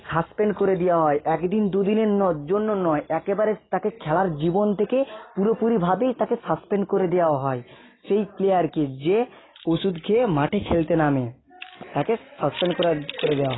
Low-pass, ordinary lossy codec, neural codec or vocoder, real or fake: 7.2 kHz; AAC, 16 kbps; none; real